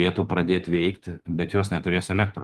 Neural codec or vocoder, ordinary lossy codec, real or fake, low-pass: autoencoder, 48 kHz, 32 numbers a frame, DAC-VAE, trained on Japanese speech; Opus, 24 kbps; fake; 14.4 kHz